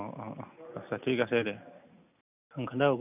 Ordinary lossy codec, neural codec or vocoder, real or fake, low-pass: none; none; real; 3.6 kHz